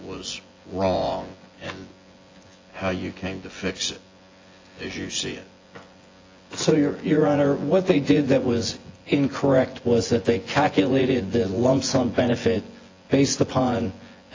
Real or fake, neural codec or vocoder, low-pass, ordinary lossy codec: fake; vocoder, 24 kHz, 100 mel bands, Vocos; 7.2 kHz; AAC, 32 kbps